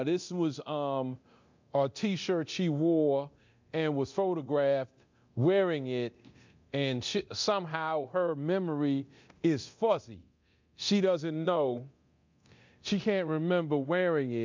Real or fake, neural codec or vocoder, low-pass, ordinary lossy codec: fake; codec, 24 kHz, 0.9 kbps, DualCodec; 7.2 kHz; MP3, 64 kbps